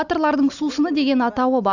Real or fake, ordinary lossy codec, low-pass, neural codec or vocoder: real; none; 7.2 kHz; none